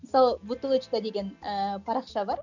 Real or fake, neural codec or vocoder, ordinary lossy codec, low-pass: real; none; none; 7.2 kHz